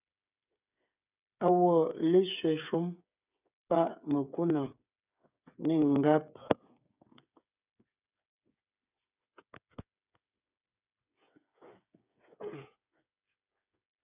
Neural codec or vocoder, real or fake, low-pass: codec, 16 kHz, 8 kbps, FreqCodec, smaller model; fake; 3.6 kHz